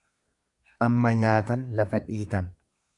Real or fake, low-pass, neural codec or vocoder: fake; 10.8 kHz; codec, 24 kHz, 1 kbps, SNAC